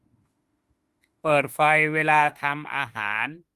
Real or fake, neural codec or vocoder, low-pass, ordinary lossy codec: fake; autoencoder, 48 kHz, 32 numbers a frame, DAC-VAE, trained on Japanese speech; 14.4 kHz; Opus, 32 kbps